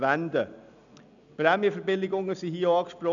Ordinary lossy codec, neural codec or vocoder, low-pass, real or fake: none; none; 7.2 kHz; real